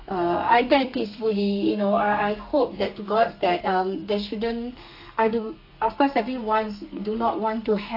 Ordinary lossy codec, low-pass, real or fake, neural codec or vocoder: AAC, 32 kbps; 5.4 kHz; fake; codec, 32 kHz, 1.9 kbps, SNAC